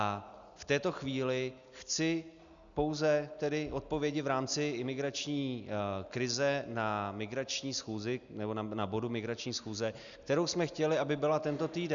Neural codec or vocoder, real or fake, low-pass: none; real; 7.2 kHz